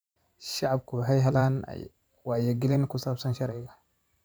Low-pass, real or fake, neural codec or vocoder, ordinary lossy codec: none; fake; vocoder, 44.1 kHz, 128 mel bands every 512 samples, BigVGAN v2; none